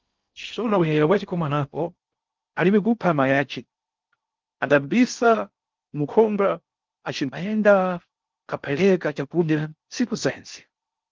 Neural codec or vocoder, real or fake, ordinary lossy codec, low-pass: codec, 16 kHz in and 24 kHz out, 0.6 kbps, FocalCodec, streaming, 4096 codes; fake; Opus, 32 kbps; 7.2 kHz